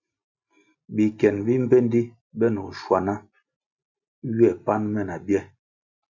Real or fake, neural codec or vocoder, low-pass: real; none; 7.2 kHz